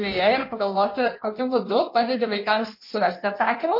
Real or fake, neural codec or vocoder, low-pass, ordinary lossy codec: fake; codec, 16 kHz in and 24 kHz out, 1.1 kbps, FireRedTTS-2 codec; 5.4 kHz; MP3, 32 kbps